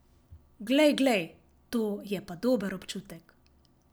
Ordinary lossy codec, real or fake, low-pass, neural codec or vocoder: none; real; none; none